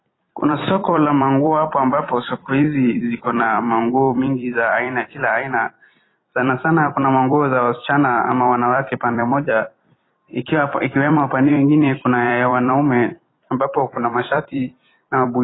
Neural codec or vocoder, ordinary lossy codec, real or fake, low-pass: vocoder, 44.1 kHz, 128 mel bands every 256 samples, BigVGAN v2; AAC, 16 kbps; fake; 7.2 kHz